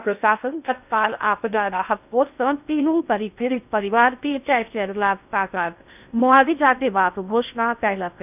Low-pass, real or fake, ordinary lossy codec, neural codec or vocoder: 3.6 kHz; fake; none; codec, 16 kHz in and 24 kHz out, 0.6 kbps, FocalCodec, streaming, 2048 codes